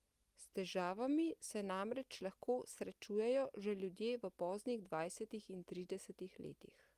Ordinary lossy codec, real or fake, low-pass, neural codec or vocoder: Opus, 32 kbps; real; 14.4 kHz; none